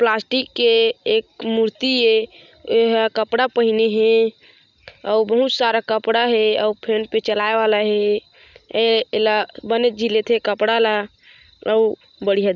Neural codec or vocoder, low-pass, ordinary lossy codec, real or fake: none; 7.2 kHz; none; real